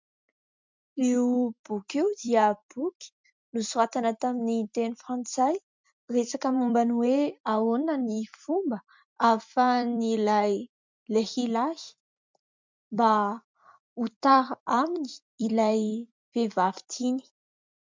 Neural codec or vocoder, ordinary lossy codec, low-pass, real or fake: vocoder, 44.1 kHz, 128 mel bands every 256 samples, BigVGAN v2; MP3, 64 kbps; 7.2 kHz; fake